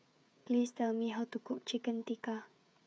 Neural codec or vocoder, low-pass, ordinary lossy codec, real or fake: codec, 16 kHz, 16 kbps, FreqCodec, smaller model; none; none; fake